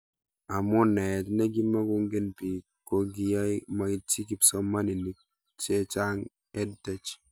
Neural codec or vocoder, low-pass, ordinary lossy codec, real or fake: none; none; none; real